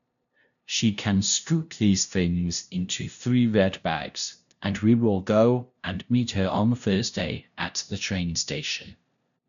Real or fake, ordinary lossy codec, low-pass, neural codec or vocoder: fake; none; 7.2 kHz; codec, 16 kHz, 0.5 kbps, FunCodec, trained on LibriTTS, 25 frames a second